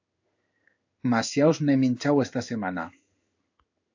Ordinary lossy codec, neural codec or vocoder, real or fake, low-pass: AAC, 48 kbps; codec, 16 kHz in and 24 kHz out, 1 kbps, XY-Tokenizer; fake; 7.2 kHz